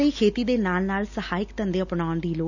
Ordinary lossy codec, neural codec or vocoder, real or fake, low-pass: none; none; real; 7.2 kHz